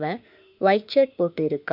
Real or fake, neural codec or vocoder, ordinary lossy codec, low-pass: fake; autoencoder, 48 kHz, 32 numbers a frame, DAC-VAE, trained on Japanese speech; none; 5.4 kHz